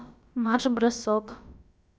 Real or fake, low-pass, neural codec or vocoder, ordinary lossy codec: fake; none; codec, 16 kHz, about 1 kbps, DyCAST, with the encoder's durations; none